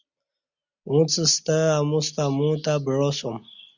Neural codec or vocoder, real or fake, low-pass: none; real; 7.2 kHz